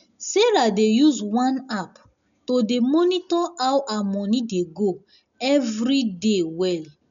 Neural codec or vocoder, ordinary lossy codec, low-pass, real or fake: none; MP3, 96 kbps; 7.2 kHz; real